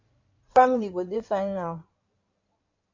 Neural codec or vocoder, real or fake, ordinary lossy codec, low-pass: codec, 16 kHz in and 24 kHz out, 2.2 kbps, FireRedTTS-2 codec; fake; MP3, 64 kbps; 7.2 kHz